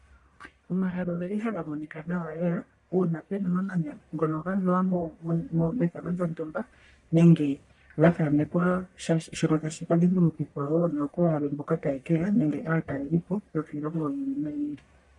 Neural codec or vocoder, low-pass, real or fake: codec, 44.1 kHz, 1.7 kbps, Pupu-Codec; 10.8 kHz; fake